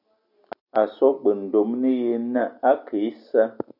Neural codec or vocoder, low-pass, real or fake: none; 5.4 kHz; real